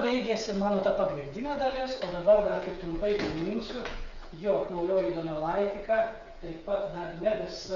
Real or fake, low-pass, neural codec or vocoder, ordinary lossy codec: fake; 7.2 kHz; codec, 16 kHz, 8 kbps, FreqCodec, smaller model; Opus, 64 kbps